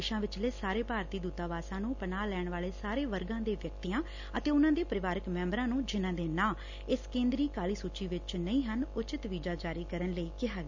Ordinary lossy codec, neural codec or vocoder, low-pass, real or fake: none; none; 7.2 kHz; real